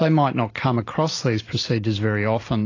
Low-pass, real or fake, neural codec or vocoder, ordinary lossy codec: 7.2 kHz; real; none; AAC, 32 kbps